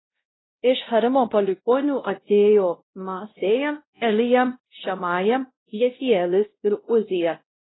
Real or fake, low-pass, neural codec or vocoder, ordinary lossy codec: fake; 7.2 kHz; codec, 16 kHz, 0.5 kbps, X-Codec, WavLM features, trained on Multilingual LibriSpeech; AAC, 16 kbps